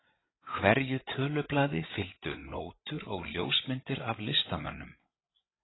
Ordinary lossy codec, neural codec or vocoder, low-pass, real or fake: AAC, 16 kbps; codec, 16 kHz, 8 kbps, FreqCodec, larger model; 7.2 kHz; fake